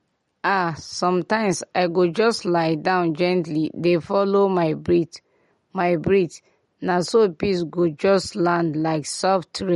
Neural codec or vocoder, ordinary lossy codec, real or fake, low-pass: none; MP3, 48 kbps; real; 19.8 kHz